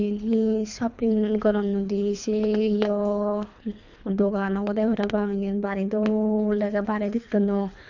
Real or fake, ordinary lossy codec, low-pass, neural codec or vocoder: fake; none; 7.2 kHz; codec, 24 kHz, 3 kbps, HILCodec